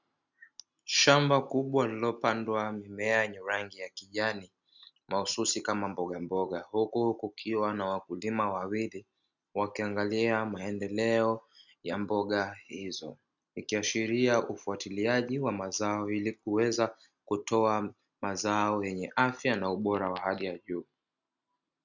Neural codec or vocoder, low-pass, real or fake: none; 7.2 kHz; real